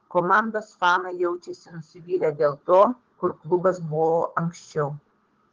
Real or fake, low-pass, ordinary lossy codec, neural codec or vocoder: fake; 7.2 kHz; Opus, 16 kbps; codec, 16 kHz, 4 kbps, FunCodec, trained on Chinese and English, 50 frames a second